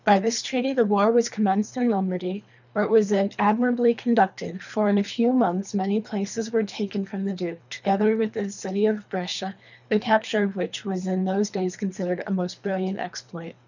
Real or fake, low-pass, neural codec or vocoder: fake; 7.2 kHz; codec, 24 kHz, 3 kbps, HILCodec